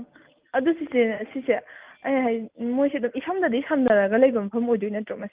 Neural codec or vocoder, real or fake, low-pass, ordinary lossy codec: none; real; 3.6 kHz; Opus, 24 kbps